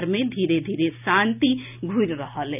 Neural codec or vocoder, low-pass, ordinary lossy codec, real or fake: none; 3.6 kHz; none; real